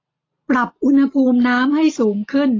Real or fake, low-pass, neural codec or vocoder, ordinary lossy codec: real; 7.2 kHz; none; AAC, 32 kbps